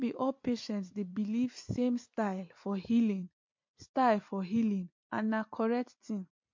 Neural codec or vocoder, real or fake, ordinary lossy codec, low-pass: vocoder, 44.1 kHz, 128 mel bands every 256 samples, BigVGAN v2; fake; MP3, 48 kbps; 7.2 kHz